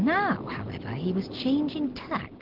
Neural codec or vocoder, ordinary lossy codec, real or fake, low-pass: none; Opus, 16 kbps; real; 5.4 kHz